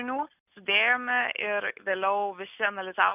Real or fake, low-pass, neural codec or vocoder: real; 3.6 kHz; none